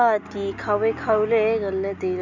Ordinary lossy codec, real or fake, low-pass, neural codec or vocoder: none; real; 7.2 kHz; none